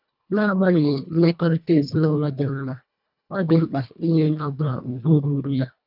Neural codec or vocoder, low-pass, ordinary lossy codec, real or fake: codec, 24 kHz, 1.5 kbps, HILCodec; 5.4 kHz; none; fake